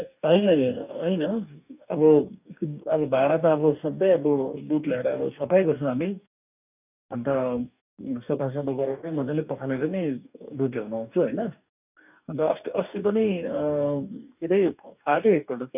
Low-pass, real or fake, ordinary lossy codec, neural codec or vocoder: 3.6 kHz; fake; none; codec, 44.1 kHz, 2.6 kbps, DAC